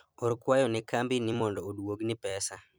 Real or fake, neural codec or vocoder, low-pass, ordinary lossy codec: fake; vocoder, 44.1 kHz, 128 mel bands every 256 samples, BigVGAN v2; none; none